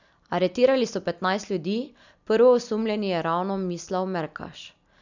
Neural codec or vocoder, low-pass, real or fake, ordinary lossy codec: none; 7.2 kHz; real; none